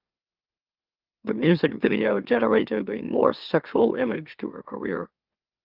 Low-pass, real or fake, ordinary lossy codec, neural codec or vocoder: 5.4 kHz; fake; Opus, 24 kbps; autoencoder, 44.1 kHz, a latent of 192 numbers a frame, MeloTTS